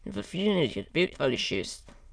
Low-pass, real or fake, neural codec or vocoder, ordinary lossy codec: none; fake; autoencoder, 22.05 kHz, a latent of 192 numbers a frame, VITS, trained on many speakers; none